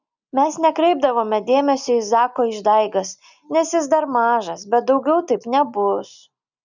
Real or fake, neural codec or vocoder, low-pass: real; none; 7.2 kHz